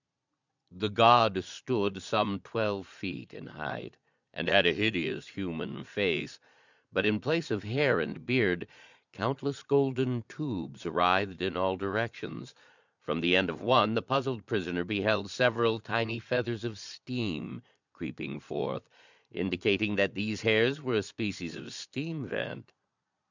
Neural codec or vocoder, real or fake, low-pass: vocoder, 22.05 kHz, 80 mel bands, Vocos; fake; 7.2 kHz